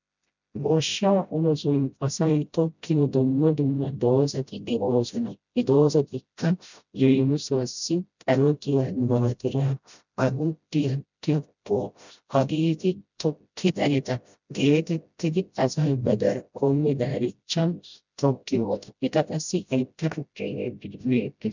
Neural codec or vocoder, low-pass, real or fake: codec, 16 kHz, 0.5 kbps, FreqCodec, smaller model; 7.2 kHz; fake